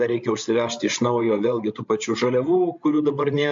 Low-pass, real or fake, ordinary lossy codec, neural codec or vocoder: 7.2 kHz; fake; MP3, 48 kbps; codec, 16 kHz, 16 kbps, FreqCodec, larger model